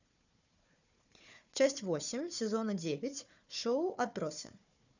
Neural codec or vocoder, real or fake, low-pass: codec, 16 kHz, 4 kbps, FunCodec, trained on Chinese and English, 50 frames a second; fake; 7.2 kHz